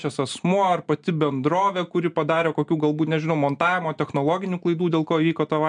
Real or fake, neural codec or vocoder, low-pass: real; none; 9.9 kHz